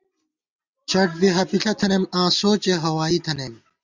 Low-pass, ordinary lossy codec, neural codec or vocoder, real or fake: 7.2 kHz; Opus, 64 kbps; none; real